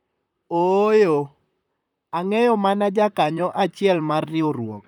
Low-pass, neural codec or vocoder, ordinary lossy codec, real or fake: 19.8 kHz; vocoder, 44.1 kHz, 128 mel bands, Pupu-Vocoder; none; fake